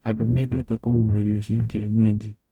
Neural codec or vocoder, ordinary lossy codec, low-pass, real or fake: codec, 44.1 kHz, 0.9 kbps, DAC; none; 19.8 kHz; fake